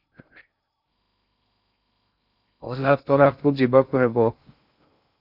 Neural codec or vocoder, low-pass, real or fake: codec, 16 kHz in and 24 kHz out, 0.6 kbps, FocalCodec, streaming, 2048 codes; 5.4 kHz; fake